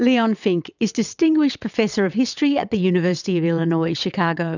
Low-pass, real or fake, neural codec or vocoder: 7.2 kHz; fake; vocoder, 22.05 kHz, 80 mel bands, WaveNeXt